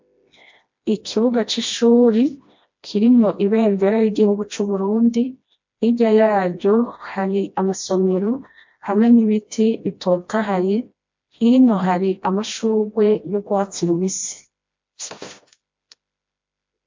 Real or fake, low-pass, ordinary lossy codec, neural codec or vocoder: fake; 7.2 kHz; MP3, 48 kbps; codec, 16 kHz, 1 kbps, FreqCodec, smaller model